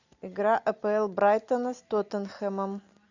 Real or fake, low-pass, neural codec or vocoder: real; 7.2 kHz; none